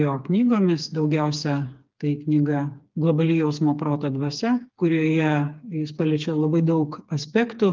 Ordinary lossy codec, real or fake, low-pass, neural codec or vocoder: Opus, 24 kbps; fake; 7.2 kHz; codec, 16 kHz, 4 kbps, FreqCodec, smaller model